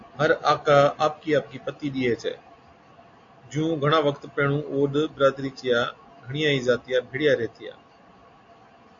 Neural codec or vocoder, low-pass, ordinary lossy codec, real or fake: none; 7.2 kHz; AAC, 48 kbps; real